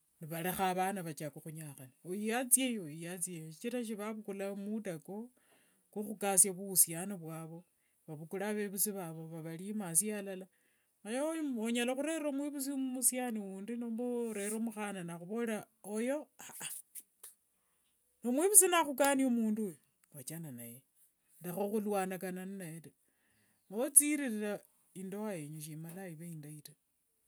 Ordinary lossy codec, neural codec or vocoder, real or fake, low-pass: none; none; real; none